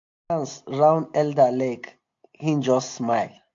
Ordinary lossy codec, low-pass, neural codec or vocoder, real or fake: AAC, 48 kbps; 7.2 kHz; none; real